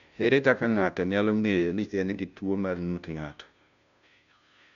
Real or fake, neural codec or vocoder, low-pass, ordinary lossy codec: fake; codec, 16 kHz, 0.5 kbps, FunCodec, trained on Chinese and English, 25 frames a second; 7.2 kHz; none